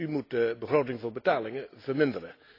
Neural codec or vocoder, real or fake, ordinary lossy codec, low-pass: none; real; AAC, 32 kbps; 5.4 kHz